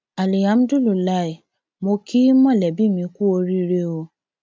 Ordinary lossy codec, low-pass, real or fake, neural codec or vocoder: none; none; real; none